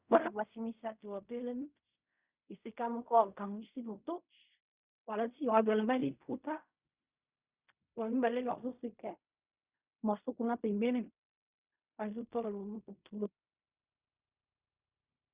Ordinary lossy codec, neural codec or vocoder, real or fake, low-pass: Opus, 64 kbps; codec, 16 kHz in and 24 kHz out, 0.4 kbps, LongCat-Audio-Codec, fine tuned four codebook decoder; fake; 3.6 kHz